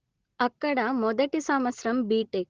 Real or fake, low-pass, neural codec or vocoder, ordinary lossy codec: real; 7.2 kHz; none; Opus, 16 kbps